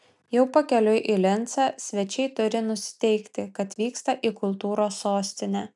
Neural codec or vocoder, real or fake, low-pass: none; real; 10.8 kHz